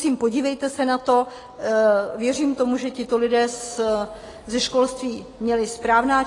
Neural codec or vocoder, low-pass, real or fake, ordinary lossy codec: none; 10.8 kHz; real; AAC, 32 kbps